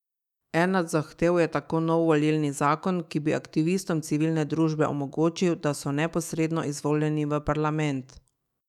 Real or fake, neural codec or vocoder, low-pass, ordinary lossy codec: fake; autoencoder, 48 kHz, 128 numbers a frame, DAC-VAE, trained on Japanese speech; 19.8 kHz; none